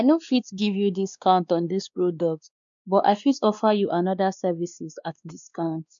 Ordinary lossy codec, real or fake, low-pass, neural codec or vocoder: none; fake; 7.2 kHz; codec, 16 kHz, 2 kbps, X-Codec, WavLM features, trained on Multilingual LibriSpeech